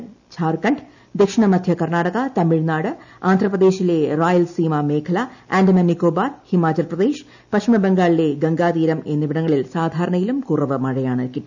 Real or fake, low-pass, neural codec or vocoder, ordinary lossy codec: real; 7.2 kHz; none; none